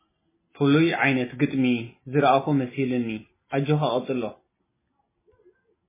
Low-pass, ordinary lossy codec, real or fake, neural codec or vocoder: 3.6 kHz; MP3, 16 kbps; real; none